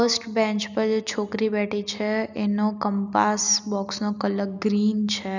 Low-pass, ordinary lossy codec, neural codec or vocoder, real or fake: 7.2 kHz; none; none; real